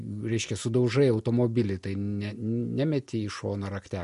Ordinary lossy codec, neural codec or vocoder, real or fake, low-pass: MP3, 48 kbps; none; real; 14.4 kHz